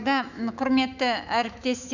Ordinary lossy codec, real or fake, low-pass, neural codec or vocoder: none; fake; 7.2 kHz; autoencoder, 48 kHz, 128 numbers a frame, DAC-VAE, trained on Japanese speech